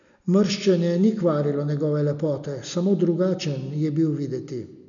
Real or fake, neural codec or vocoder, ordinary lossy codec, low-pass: real; none; none; 7.2 kHz